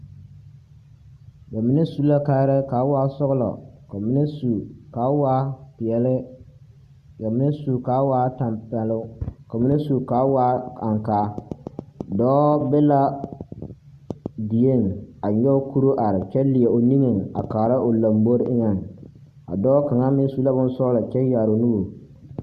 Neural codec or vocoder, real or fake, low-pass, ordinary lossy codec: none; real; 14.4 kHz; Opus, 64 kbps